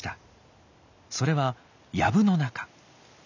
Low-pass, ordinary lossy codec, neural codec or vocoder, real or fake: 7.2 kHz; none; none; real